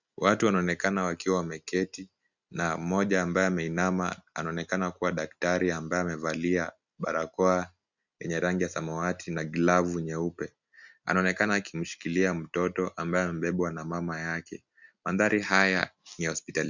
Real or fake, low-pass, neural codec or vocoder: real; 7.2 kHz; none